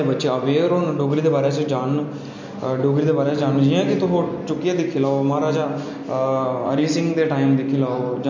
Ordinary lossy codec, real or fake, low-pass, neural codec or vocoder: MP3, 48 kbps; real; 7.2 kHz; none